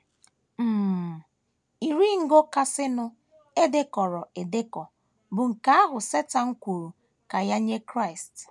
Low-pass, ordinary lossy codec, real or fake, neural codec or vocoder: none; none; real; none